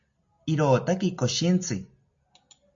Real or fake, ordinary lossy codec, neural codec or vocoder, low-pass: real; MP3, 48 kbps; none; 7.2 kHz